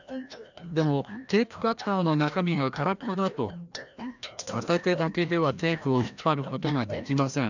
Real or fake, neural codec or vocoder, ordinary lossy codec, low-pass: fake; codec, 16 kHz, 1 kbps, FreqCodec, larger model; none; 7.2 kHz